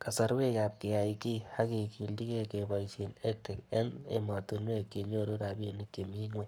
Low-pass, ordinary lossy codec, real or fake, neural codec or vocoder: none; none; fake; codec, 44.1 kHz, 7.8 kbps, Pupu-Codec